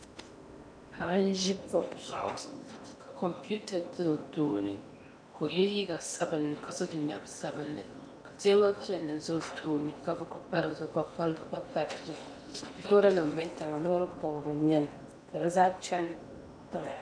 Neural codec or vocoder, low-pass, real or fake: codec, 16 kHz in and 24 kHz out, 0.8 kbps, FocalCodec, streaming, 65536 codes; 9.9 kHz; fake